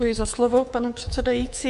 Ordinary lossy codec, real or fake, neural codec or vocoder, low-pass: MP3, 64 kbps; fake; codec, 24 kHz, 3.1 kbps, DualCodec; 10.8 kHz